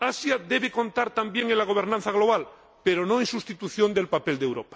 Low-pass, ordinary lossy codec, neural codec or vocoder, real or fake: none; none; none; real